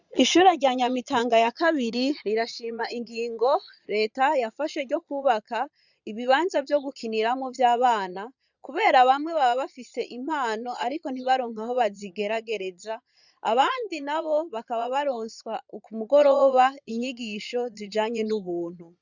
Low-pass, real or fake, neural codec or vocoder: 7.2 kHz; fake; vocoder, 22.05 kHz, 80 mel bands, Vocos